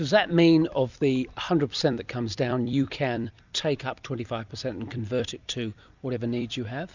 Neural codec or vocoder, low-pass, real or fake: vocoder, 44.1 kHz, 128 mel bands every 256 samples, BigVGAN v2; 7.2 kHz; fake